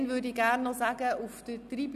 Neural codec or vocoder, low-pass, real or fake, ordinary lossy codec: none; 14.4 kHz; real; none